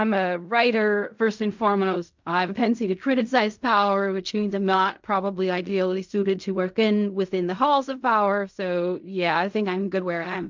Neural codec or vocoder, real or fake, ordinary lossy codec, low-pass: codec, 16 kHz in and 24 kHz out, 0.4 kbps, LongCat-Audio-Codec, fine tuned four codebook decoder; fake; MP3, 64 kbps; 7.2 kHz